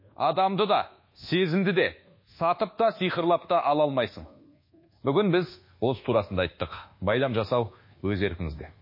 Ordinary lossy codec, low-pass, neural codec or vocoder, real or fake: MP3, 24 kbps; 5.4 kHz; codec, 24 kHz, 1.2 kbps, DualCodec; fake